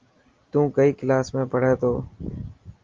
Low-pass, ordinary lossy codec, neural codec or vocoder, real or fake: 7.2 kHz; Opus, 32 kbps; none; real